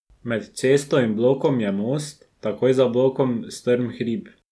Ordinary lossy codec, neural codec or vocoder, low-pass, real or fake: none; none; none; real